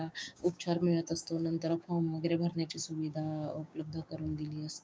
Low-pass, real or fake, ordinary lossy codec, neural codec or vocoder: none; fake; none; codec, 16 kHz, 6 kbps, DAC